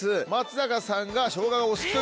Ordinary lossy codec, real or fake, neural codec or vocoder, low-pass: none; real; none; none